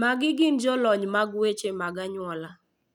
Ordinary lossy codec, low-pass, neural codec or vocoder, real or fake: none; 19.8 kHz; none; real